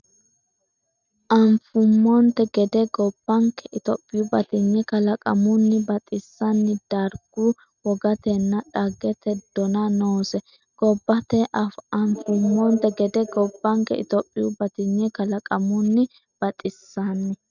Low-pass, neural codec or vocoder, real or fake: 7.2 kHz; none; real